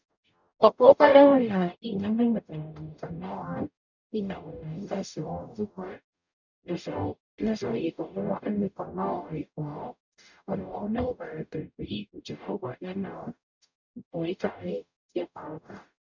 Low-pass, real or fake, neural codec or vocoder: 7.2 kHz; fake; codec, 44.1 kHz, 0.9 kbps, DAC